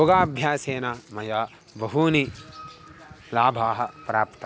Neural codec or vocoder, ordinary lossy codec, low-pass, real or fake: none; none; none; real